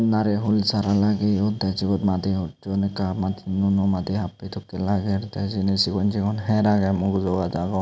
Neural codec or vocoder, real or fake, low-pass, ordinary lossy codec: none; real; none; none